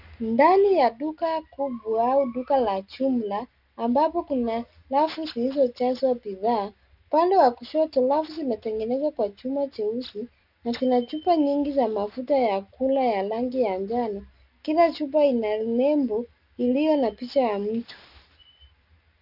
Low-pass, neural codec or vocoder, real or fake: 5.4 kHz; none; real